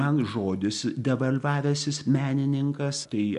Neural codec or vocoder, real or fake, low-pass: none; real; 10.8 kHz